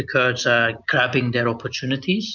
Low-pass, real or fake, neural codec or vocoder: 7.2 kHz; real; none